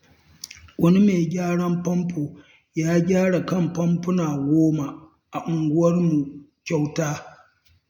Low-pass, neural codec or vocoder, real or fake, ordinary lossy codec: 19.8 kHz; none; real; none